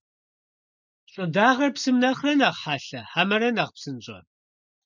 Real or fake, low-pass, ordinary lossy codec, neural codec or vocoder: real; 7.2 kHz; MP3, 64 kbps; none